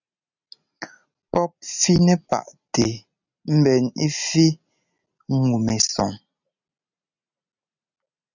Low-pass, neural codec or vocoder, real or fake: 7.2 kHz; none; real